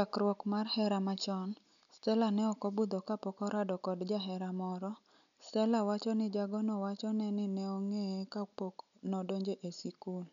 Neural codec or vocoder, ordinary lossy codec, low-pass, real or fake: none; none; 7.2 kHz; real